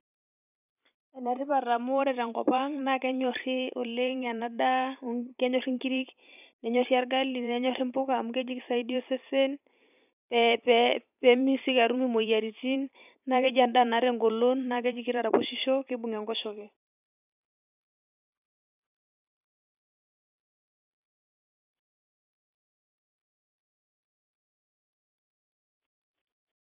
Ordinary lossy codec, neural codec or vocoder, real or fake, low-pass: none; vocoder, 44.1 kHz, 128 mel bands every 512 samples, BigVGAN v2; fake; 3.6 kHz